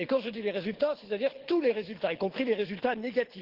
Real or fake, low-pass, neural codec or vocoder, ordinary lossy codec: fake; 5.4 kHz; codec, 24 kHz, 6 kbps, HILCodec; Opus, 16 kbps